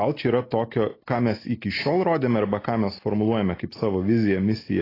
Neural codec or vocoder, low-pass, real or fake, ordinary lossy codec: none; 5.4 kHz; real; AAC, 24 kbps